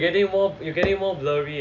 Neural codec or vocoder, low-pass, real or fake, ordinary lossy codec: none; 7.2 kHz; real; none